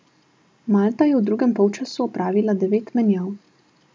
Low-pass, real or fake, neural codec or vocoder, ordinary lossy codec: none; real; none; none